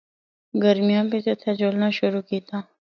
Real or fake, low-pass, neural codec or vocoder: real; 7.2 kHz; none